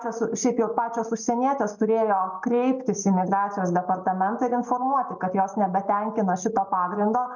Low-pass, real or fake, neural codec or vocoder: 7.2 kHz; real; none